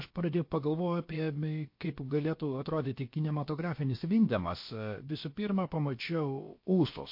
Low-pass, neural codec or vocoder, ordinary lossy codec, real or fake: 5.4 kHz; codec, 16 kHz, about 1 kbps, DyCAST, with the encoder's durations; MP3, 32 kbps; fake